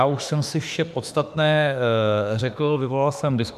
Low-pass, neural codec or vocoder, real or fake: 14.4 kHz; autoencoder, 48 kHz, 32 numbers a frame, DAC-VAE, trained on Japanese speech; fake